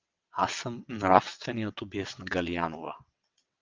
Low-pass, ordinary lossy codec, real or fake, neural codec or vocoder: 7.2 kHz; Opus, 32 kbps; fake; vocoder, 22.05 kHz, 80 mel bands, WaveNeXt